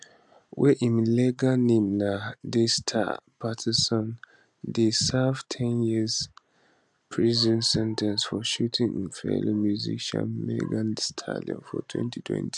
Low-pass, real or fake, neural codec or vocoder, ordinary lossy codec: 10.8 kHz; real; none; none